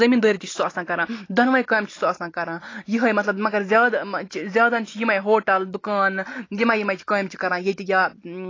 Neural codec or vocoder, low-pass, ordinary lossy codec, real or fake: none; 7.2 kHz; AAC, 32 kbps; real